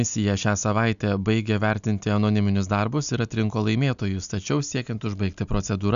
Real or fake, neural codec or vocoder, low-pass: real; none; 7.2 kHz